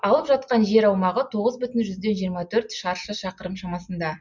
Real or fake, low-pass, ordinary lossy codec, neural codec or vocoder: real; 7.2 kHz; none; none